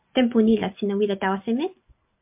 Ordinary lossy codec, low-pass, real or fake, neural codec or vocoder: MP3, 32 kbps; 3.6 kHz; real; none